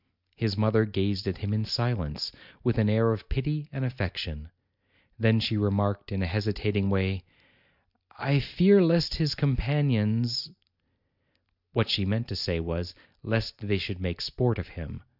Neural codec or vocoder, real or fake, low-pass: none; real; 5.4 kHz